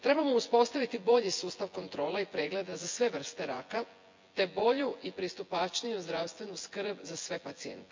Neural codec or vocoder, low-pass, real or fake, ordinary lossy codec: vocoder, 24 kHz, 100 mel bands, Vocos; 7.2 kHz; fake; MP3, 48 kbps